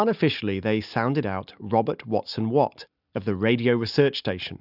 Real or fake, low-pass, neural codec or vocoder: real; 5.4 kHz; none